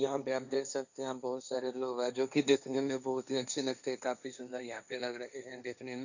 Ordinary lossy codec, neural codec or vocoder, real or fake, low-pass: none; codec, 16 kHz, 1.1 kbps, Voila-Tokenizer; fake; 7.2 kHz